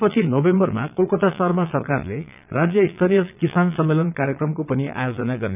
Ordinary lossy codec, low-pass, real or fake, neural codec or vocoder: none; 3.6 kHz; fake; vocoder, 22.05 kHz, 80 mel bands, Vocos